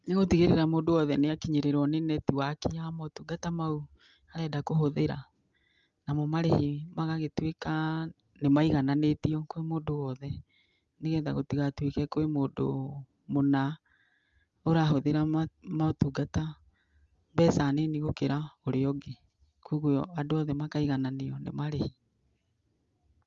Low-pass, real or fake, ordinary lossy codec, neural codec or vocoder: 7.2 kHz; real; Opus, 32 kbps; none